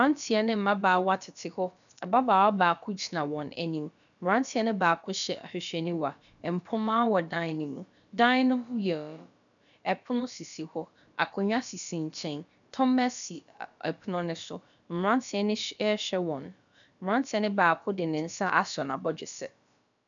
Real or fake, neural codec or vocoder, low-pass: fake; codec, 16 kHz, about 1 kbps, DyCAST, with the encoder's durations; 7.2 kHz